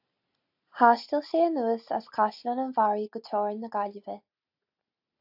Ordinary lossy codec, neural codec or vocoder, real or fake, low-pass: AAC, 48 kbps; none; real; 5.4 kHz